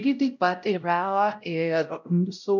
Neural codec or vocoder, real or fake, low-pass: codec, 16 kHz, 0.5 kbps, X-Codec, WavLM features, trained on Multilingual LibriSpeech; fake; 7.2 kHz